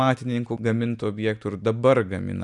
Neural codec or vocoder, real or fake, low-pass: none; real; 10.8 kHz